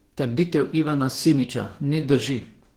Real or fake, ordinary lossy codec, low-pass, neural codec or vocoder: fake; Opus, 16 kbps; 19.8 kHz; codec, 44.1 kHz, 2.6 kbps, DAC